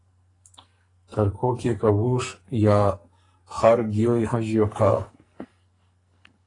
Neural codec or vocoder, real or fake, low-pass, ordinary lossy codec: codec, 44.1 kHz, 2.6 kbps, SNAC; fake; 10.8 kHz; AAC, 32 kbps